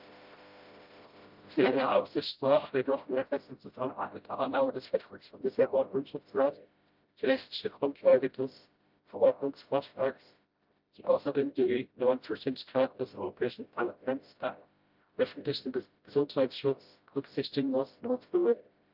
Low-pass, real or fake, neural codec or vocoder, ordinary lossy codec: 5.4 kHz; fake; codec, 16 kHz, 0.5 kbps, FreqCodec, smaller model; Opus, 24 kbps